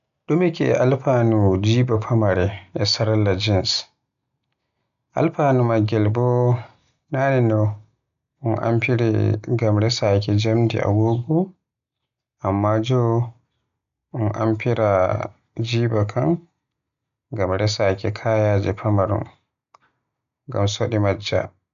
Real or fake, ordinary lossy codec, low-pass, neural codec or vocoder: real; none; 7.2 kHz; none